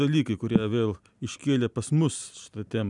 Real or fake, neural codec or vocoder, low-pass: real; none; 10.8 kHz